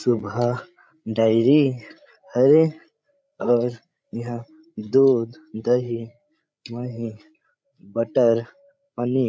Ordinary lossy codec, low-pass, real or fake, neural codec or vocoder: none; none; fake; codec, 16 kHz, 16 kbps, FreqCodec, larger model